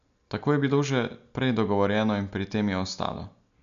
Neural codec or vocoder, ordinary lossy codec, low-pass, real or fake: none; none; 7.2 kHz; real